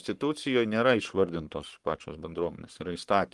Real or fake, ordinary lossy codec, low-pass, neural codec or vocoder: fake; Opus, 24 kbps; 10.8 kHz; codec, 44.1 kHz, 7.8 kbps, Pupu-Codec